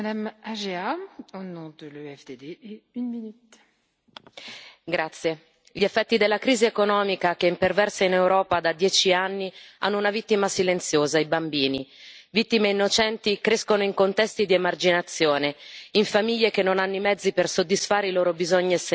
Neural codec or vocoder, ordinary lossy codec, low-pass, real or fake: none; none; none; real